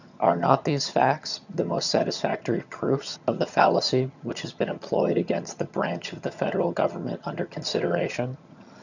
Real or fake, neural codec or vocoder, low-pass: fake; vocoder, 22.05 kHz, 80 mel bands, HiFi-GAN; 7.2 kHz